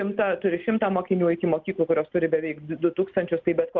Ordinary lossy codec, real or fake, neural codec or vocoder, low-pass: Opus, 32 kbps; real; none; 7.2 kHz